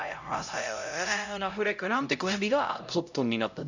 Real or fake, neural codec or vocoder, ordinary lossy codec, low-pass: fake; codec, 16 kHz, 0.5 kbps, X-Codec, HuBERT features, trained on LibriSpeech; none; 7.2 kHz